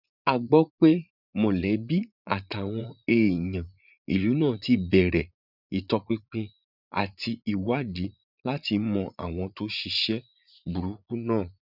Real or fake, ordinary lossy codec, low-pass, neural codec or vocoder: fake; none; 5.4 kHz; vocoder, 44.1 kHz, 80 mel bands, Vocos